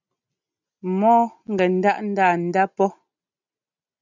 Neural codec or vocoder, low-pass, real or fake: none; 7.2 kHz; real